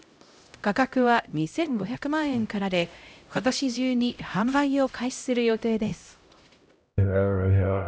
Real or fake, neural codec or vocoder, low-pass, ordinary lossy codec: fake; codec, 16 kHz, 0.5 kbps, X-Codec, HuBERT features, trained on LibriSpeech; none; none